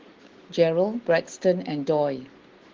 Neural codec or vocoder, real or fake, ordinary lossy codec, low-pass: none; real; Opus, 16 kbps; 7.2 kHz